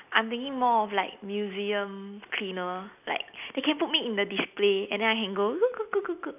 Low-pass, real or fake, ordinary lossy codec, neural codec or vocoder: 3.6 kHz; real; none; none